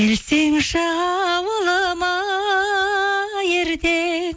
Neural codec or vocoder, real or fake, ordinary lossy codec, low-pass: none; real; none; none